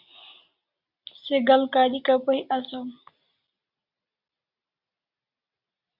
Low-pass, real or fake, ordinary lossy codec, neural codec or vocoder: 5.4 kHz; real; Opus, 64 kbps; none